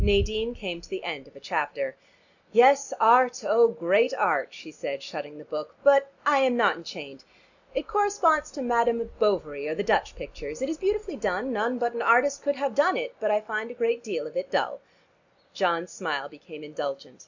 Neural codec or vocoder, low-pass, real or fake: none; 7.2 kHz; real